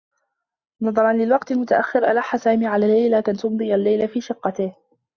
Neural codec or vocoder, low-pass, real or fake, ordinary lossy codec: none; 7.2 kHz; real; Opus, 64 kbps